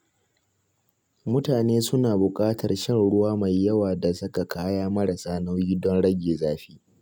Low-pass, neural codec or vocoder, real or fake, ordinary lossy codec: 19.8 kHz; none; real; none